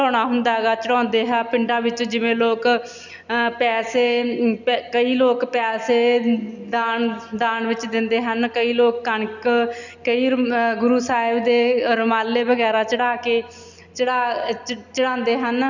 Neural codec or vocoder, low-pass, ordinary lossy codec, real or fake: none; 7.2 kHz; none; real